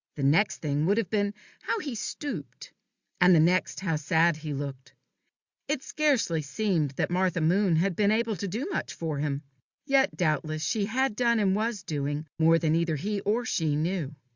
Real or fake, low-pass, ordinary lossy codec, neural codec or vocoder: real; 7.2 kHz; Opus, 64 kbps; none